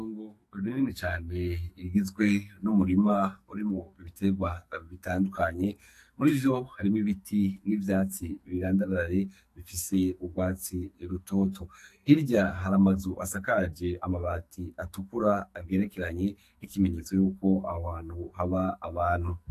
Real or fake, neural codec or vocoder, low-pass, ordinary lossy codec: fake; codec, 44.1 kHz, 2.6 kbps, SNAC; 14.4 kHz; AAC, 64 kbps